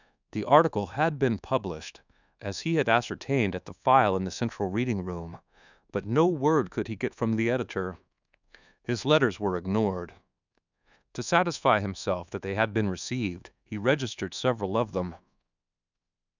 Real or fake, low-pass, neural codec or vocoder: fake; 7.2 kHz; codec, 24 kHz, 1.2 kbps, DualCodec